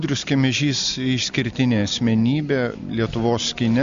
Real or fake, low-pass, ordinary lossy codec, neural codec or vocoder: real; 7.2 kHz; MP3, 64 kbps; none